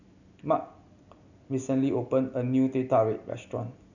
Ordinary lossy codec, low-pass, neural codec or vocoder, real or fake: none; 7.2 kHz; none; real